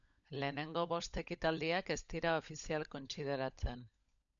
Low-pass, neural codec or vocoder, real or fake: 7.2 kHz; codec, 16 kHz, 16 kbps, FunCodec, trained on LibriTTS, 50 frames a second; fake